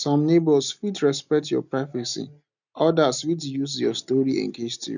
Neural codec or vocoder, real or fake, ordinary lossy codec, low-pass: none; real; none; 7.2 kHz